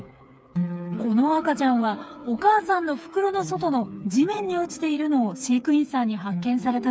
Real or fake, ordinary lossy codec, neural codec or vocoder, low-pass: fake; none; codec, 16 kHz, 4 kbps, FreqCodec, smaller model; none